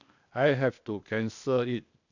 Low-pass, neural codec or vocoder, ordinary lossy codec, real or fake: 7.2 kHz; codec, 16 kHz, 0.8 kbps, ZipCodec; none; fake